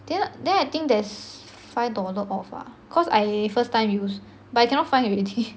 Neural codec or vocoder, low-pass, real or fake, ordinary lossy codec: none; none; real; none